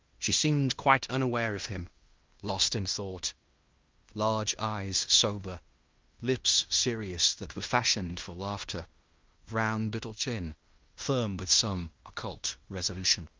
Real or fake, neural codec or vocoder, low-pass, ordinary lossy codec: fake; codec, 16 kHz in and 24 kHz out, 0.9 kbps, LongCat-Audio-Codec, fine tuned four codebook decoder; 7.2 kHz; Opus, 24 kbps